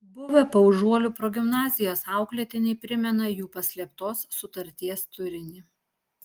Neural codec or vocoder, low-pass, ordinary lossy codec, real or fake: none; 19.8 kHz; Opus, 32 kbps; real